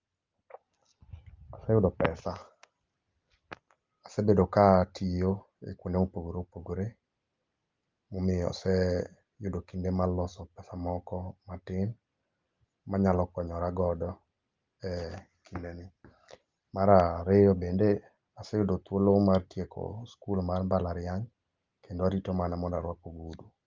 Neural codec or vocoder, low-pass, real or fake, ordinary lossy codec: none; 7.2 kHz; real; Opus, 32 kbps